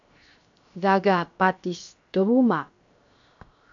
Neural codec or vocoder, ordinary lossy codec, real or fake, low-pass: codec, 16 kHz, 0.7 kbps, FocalCodec; AAC, 64 kbps; fake; 7.2 kHz